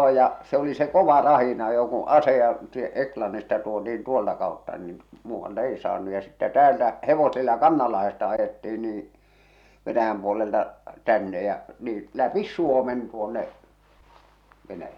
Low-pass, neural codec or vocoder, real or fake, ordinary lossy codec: 19.8 kHz; none; real; none